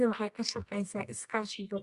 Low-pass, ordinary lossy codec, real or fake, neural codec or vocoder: 10.8 kHz; Opus, 64 kbps; fake; codec, 24 kHz, 0.9 kbps, WavTokenizer, medium music audio release